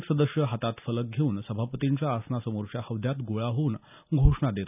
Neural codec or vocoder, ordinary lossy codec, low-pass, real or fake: none; none; 3.6 kHz; real